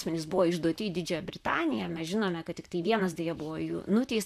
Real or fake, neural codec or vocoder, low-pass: fake; vocoder, 44.1 kHz, 128 mel bands, Pupu-Vocoder; 14.4 kHz